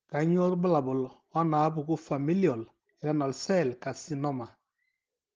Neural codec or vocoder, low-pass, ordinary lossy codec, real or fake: none; 7.2 kHz; Opus, 16 kbps; real